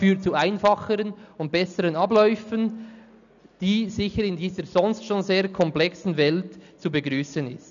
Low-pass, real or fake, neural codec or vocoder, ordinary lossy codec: 7.2 kHz; real; none; none